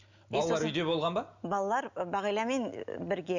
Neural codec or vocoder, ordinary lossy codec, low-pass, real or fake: none; none; 7.2 kHz; real